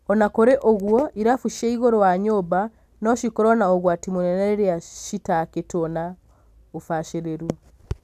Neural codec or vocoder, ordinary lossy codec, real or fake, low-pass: none; none; real; 14.4 kHz